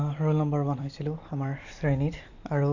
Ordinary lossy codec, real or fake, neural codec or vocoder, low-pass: none; fake; vocoder, 44.1 kHz, 128 mel bands every 256 samples, BigVGAN v2; 7.2 kHz